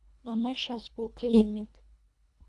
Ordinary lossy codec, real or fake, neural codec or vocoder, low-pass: none; fake; codec, 24 kHz, 1.5 kbps, HILCodec; none